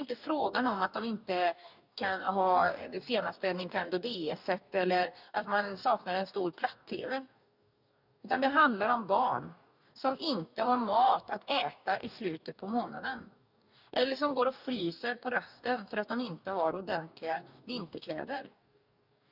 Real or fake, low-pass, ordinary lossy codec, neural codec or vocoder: fake; 5.4 kHz; none; codec, 44.1 kHz, 2.6 kbps, DAC